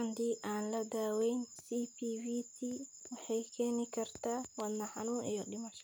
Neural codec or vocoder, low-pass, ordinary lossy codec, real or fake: none; none; none; real